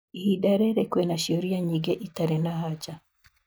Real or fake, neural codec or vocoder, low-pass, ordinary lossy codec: real; none; none; none